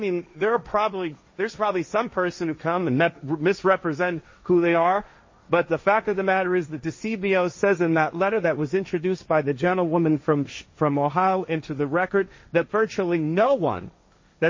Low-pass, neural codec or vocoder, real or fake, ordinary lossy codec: 7.2 kHz; codec, 16 kHz, 1.1 kbps, Voila-Tokenizer; fake; MP3, 32 kbps